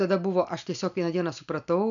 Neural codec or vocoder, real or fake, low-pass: none; real; 7.2 kHz